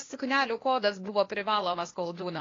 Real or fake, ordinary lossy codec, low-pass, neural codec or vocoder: fake; AAC, 32 kbps; 7.2 kHz; codec, 16 kHz, 0.8 kbps, ZipCodec